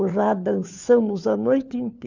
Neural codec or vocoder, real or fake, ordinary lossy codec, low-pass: codec, 16 kHz, 4 kbps, FunCodec, trained on LibriTTS, 50 frames a second; fake; none; 7.2 kHz